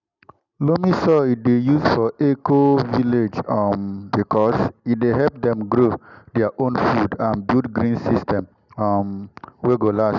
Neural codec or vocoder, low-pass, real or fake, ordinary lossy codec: none; 7.2 kHz; real; none